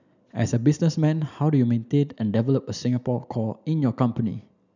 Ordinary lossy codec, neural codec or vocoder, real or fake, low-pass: none; none; real; 7.2 kHz